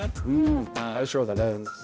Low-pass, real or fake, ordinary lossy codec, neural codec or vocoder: none; fake; none; codec, 16 kHz, 0.5 kbps, X-Codec, HuBERT features, trained on balanced general audio